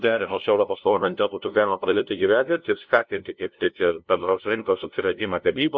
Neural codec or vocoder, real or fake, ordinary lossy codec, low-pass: codec, 16 kHz, 0.5 kbps, FunCodec, trained on LibriTTS, 25 frames a second; fake; MP3, 48 kbps; 7.2 kHz